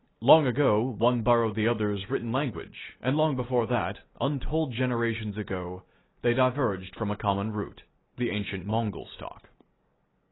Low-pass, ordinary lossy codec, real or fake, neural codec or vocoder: 7.2 kHz; AAC, 16 kbps; real; none